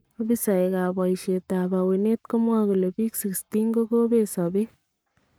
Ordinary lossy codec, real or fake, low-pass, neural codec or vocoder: none; fake; none; codec, 44.1 kHz, 7.8 kbps, Pupu-Codec